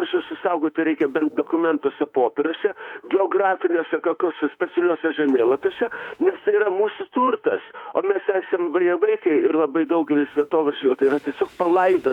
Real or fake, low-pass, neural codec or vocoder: fake; 19.8 kHz; autoencoder, 48 kHz, 32 numbers a frame, DAC-VAE, trained on Japanese speech